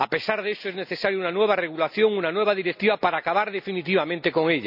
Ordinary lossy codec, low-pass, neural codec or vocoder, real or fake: none; 5.4 kHz; none; real